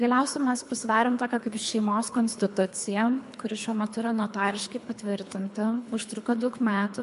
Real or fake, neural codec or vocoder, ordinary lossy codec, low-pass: fake; codec, 24 kHz, 3 kbps, HILCodec; MP3, 64 kbps; 10.8 kHz